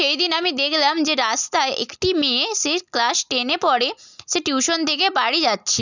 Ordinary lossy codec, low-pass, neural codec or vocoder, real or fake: none; 7.2 kHz; none; real